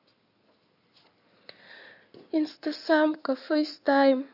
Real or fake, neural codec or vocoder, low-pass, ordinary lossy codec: fake; codec, 16 kHz in and 24 kHz out, 2.2 kbps, FireRedTTS-2 codec; 5.4 kHz; none